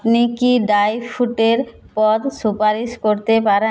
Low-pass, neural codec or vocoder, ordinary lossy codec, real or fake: none; none; none; real